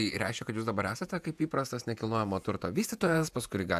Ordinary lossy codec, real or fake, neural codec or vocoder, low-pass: AAC, 96 kbps; fake; vocoder, 48 kHz, 128 mel bands, Vocos; 14.4 kHz